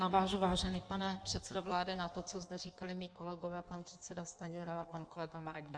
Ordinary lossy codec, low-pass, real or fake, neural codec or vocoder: MP3, 96 kbps; 9.9 kHz; fake; codec, 16 kHz in and 24 kHz out, 1.1 kbps, FireRedTTS-2 codec